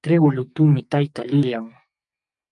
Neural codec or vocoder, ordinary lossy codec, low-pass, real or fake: codec, 44.1 kHz, 2.6 kbps, SNAC; MP3, 64 kbps; 10.8 kHz; fake